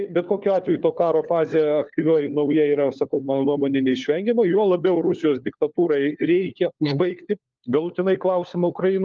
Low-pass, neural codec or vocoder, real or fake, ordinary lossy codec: 7.2 kHz; codec, 16 kHz, 4 kbps, FunCodec, trained on LibriTTS, 50 frames a second; fake; Opus, 24 kbps